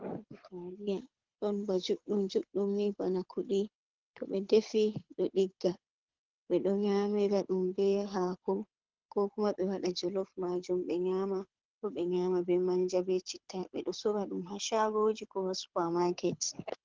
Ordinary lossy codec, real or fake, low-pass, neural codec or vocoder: Opus, 16 kbps; fake; 7.2 kHz; codec, 24 kHz, 6 kbps, HILCodec